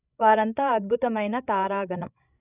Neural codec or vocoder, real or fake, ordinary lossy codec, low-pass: codec, 16 kHz, 16 kbps, FreqCodec, larger model; fake; none; 3.6 kHz